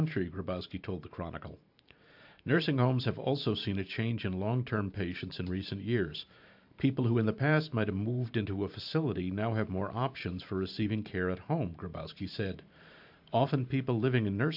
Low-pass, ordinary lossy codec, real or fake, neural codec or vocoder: 5.4 kHz; AAC, 48 kbps; fake; vocoder, 44.1 kHz, 128 mel bands every 512 samples, BigVGAN v2